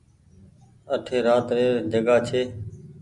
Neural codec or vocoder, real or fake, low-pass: none; real; 10.8 kHz